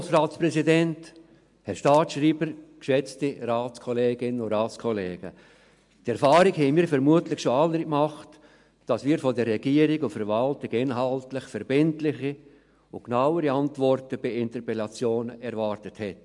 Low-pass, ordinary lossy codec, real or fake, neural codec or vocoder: 10.8 kHz; MP3, 64 kbps; real; none